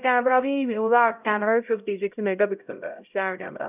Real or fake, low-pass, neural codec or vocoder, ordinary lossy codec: fake; 3.6 kHz; codec, 16 kHz, 0.5 kbps, X-Codec, HuBERT features, trained on LibriSpeech; none